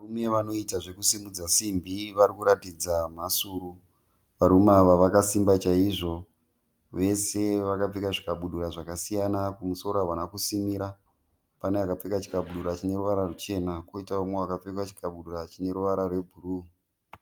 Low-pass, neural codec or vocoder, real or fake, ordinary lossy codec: 19.8 kHz; none; real; Opus, 32 kbps